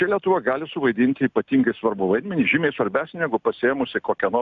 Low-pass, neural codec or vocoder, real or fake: 7.2 kHz; none; real